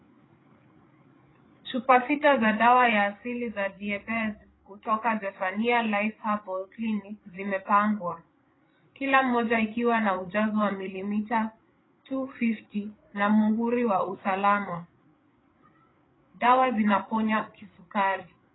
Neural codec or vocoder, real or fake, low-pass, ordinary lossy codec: codec, 16 kHz, 8 kbps, FreqCodec, larger model; fake; 7.2 kHz; AAC, 16 kbps